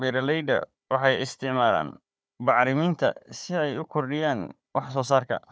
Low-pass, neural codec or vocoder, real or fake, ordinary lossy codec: none; codec, 16 kHz, 4 kbps, FunCodec, trained on Chinese and English, 50 frames a second; fake; none